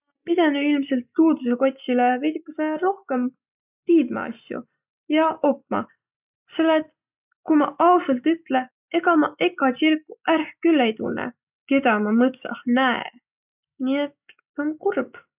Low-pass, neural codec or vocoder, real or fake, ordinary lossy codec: 3.6 kHz; none; real; none